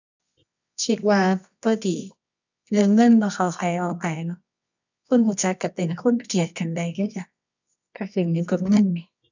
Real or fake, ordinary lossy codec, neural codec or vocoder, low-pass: fake; none; codec, 24 kHz, 0.9 kbps, WavTokenizer, medium music audio release; 7.2 kHz